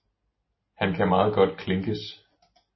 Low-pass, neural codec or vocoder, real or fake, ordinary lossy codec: 7.2 kHz; none; real; MP3, 24 kbps